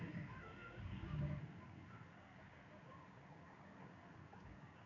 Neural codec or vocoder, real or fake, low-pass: codec, 16 kHz in and 24 kHz out, 1 kbps, XY-Tokenizer; fake; 7.2 kHz